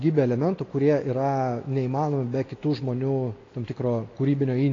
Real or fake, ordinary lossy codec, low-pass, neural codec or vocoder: real; AAC, 32 kbps; 7.2 kHz; none